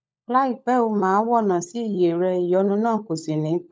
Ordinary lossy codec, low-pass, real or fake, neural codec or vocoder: none; none; fake; codec, 16 kHz, 16 kbps, FunCodec, trained on LibriTTS, 50 frames a second